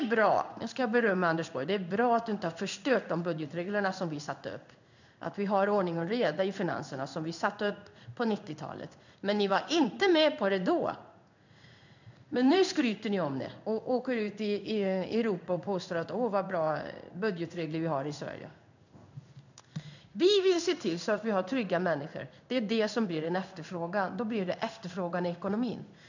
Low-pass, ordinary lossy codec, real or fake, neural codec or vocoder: 7.2 kHz; none; fake; codec, 16 kHz in and 24 kHz out, 1 kbps, XY-Tokenizer